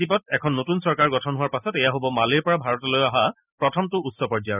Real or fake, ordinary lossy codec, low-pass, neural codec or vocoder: real; none; 3.6 kHz; none